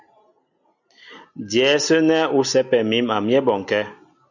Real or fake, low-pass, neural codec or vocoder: real; 7.2 kHz; none